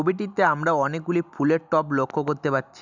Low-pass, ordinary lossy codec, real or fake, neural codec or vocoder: 7.2 kHz; none; real; none